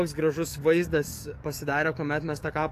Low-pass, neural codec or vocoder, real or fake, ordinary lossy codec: 14.4 kHz; codec, 44.1 kHz, 7.8 kbps, DAC; fake; AAC, 64 kbps